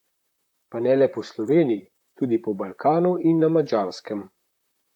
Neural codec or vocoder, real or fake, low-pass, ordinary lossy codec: vocoder, 44.1 kHz, 128 mel bands, Pupu-Vocoder; fake; 19.8 kHz; none